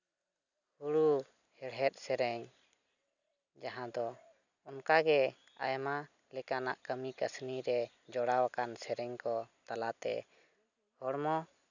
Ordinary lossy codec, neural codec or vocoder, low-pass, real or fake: none; none; 7.2 kHz; real